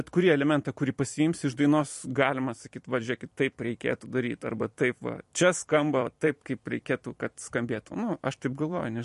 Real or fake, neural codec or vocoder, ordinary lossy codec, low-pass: fake; vocoder, 44.1 kHz, 128 mel bands every 256 samples, BigVGAN v2; MP3, 48 kbps; 14.4 kHz